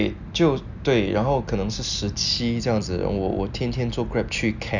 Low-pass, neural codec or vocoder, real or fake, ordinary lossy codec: 7.2 kHz; none; real; none